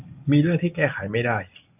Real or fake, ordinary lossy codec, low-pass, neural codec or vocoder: real; AAC, 32 kbps; 3.6 kHz; none